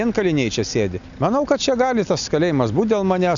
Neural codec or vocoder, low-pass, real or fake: none; 7.2 kHz; real